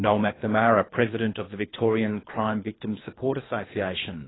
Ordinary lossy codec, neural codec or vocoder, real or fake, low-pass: AAC, 16 kbps; codec, 24 kHz, 3 kbps, HILCodec; fake; 7.2 kHz